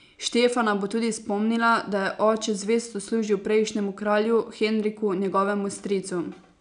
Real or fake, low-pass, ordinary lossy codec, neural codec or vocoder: real; 9.9 kHz; none; none